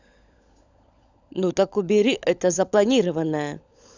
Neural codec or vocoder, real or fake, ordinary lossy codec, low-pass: codec, 16 kHz, 16 kbps, FunCodec, trained on LibriTTS, 50 frames a second; fake; Opus, 64 kbps; 7.2 kHz